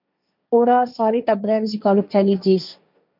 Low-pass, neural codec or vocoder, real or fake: 5.4 kHz; codec, 16 kHz, 1.1 kbps, Voila-Tokenizer; fake